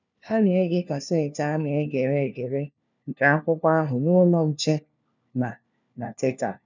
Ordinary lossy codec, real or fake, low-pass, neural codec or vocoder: none; fake; 7.2 kHz; codec, 16 kHz, 1 kbps, FunCodec, trained on LibriTTS, 50 frames a second